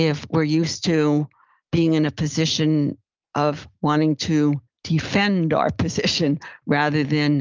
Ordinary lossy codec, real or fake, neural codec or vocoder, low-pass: Opus, 32 kbps; real; none; 7.2 kHz